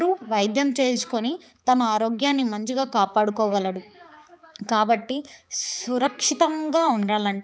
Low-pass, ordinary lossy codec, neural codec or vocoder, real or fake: none; none; codec, 16 kHz, 4 kbps, X-Codec, HuBERT features, trained on balanced general audio; fake